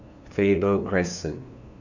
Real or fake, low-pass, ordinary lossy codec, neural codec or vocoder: fake; 7.2 kHz; none; codec, 16 kHz, 2 kbps, FunCodec, trained on LibriTTS, 25 frames a second